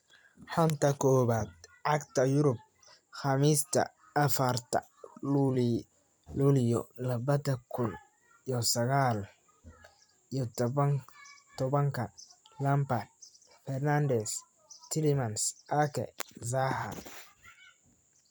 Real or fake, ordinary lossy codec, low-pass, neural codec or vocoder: fake; none; none; vocoder, 44.1 kHz, 128 mel bands every 256 samples, BigVGAN v2